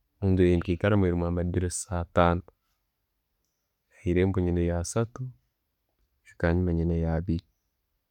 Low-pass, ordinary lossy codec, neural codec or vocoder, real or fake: 19.8 kHz; none; none; real